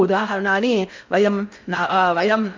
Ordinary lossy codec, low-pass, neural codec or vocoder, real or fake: MP3, 64 kbps; 7.2 kHz; codec, 16 kHz in and 24 kHz out, 0.6 kbps, FocalCodec, streaming, 2048 codes; fake